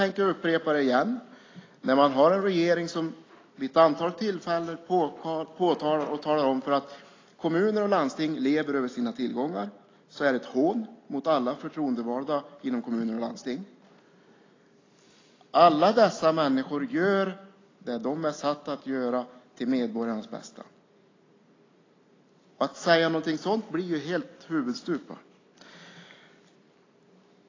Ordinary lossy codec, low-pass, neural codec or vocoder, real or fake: AAC, 32 kbps; 7.2 kHz; none; real